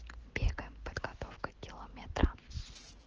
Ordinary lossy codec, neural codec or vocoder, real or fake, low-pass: Opus, 16 kbps; none; real; 7.2 kHz